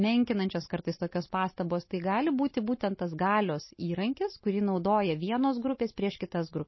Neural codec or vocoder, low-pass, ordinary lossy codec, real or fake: none; 7.2 kHz; MP3, 24 kbps; real